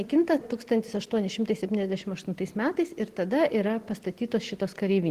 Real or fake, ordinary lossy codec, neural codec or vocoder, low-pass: real; Opus, 16 kbps; none; 14.4 kHz